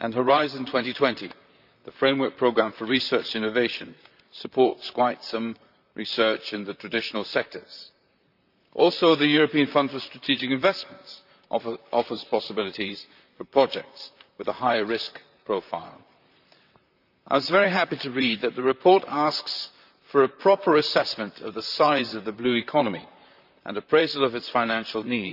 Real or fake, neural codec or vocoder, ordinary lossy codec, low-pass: fake; vocoder, 44.1 kHz, 128 mel bands, Pupu-Vocoder; none; 5.4 kHz